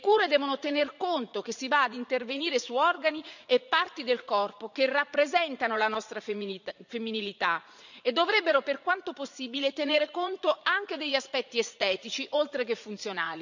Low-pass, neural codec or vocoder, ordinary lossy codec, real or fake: 7.2 kHz; vocoder, 44.1 kHz, 80 mel bands, Vocos; none; fake